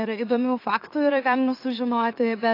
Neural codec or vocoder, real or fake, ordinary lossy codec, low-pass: autoencoder, 44.1 kHz, a latent of 192 numbers a frame, MeloTTS; fake; AAC, 32 kbps; 5.4 kHz